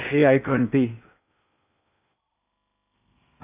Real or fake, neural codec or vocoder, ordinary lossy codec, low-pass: fake; codec, 16 kHz in and 24 kHz out, 0.8 kbps, FocalCodec, streaming, 65536 codes; none; 3.6 kHz